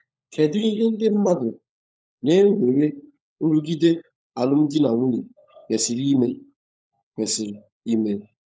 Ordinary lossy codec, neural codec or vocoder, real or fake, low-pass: none; codec, 16 kHz, 16 kbps, FunCodec, trained on LibriTTS, 50 frames a second; fake; none